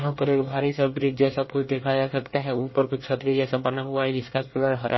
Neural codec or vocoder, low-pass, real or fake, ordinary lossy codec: codec, 24 kHz, 1 kbps, SNAC; 7.2 kHz; fake; MP3, 24 kbps